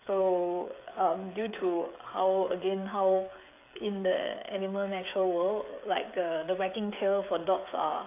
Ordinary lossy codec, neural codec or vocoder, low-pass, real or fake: AAC, 24 kbps; codec, 16 kHz, 16 kbps, FreqCodec, smaller model; 3.6 kHz; fake